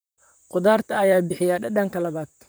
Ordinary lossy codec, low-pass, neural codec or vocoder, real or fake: none; none; vocoder, 44.1 kHz, 128 mel bands, Pupu-Vocoder; fake